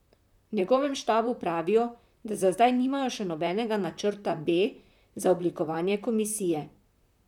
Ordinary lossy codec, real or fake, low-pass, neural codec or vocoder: none; fake; 19.8 kHz; vocoder, 44.1 kHz, 128 mel bands, Pupu-Vocoder